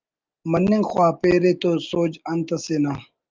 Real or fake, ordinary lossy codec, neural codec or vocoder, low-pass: real; Opus, 24 kbps; none; 7.2 kHz